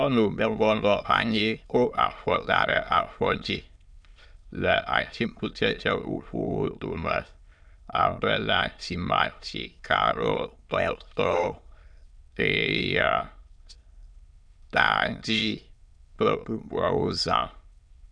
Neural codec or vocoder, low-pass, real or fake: autoencoder, 22.05 kHz, a latent of 192 numbers a frame, VITS, trained on many speakers; 9.9 kHz; fake